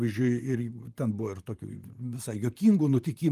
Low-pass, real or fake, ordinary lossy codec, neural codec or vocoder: 14.4 kHz; fake; Opus, 32 kbps; vocoder, 44.1 kHz, 128 mel bands, Pupu-Vocoder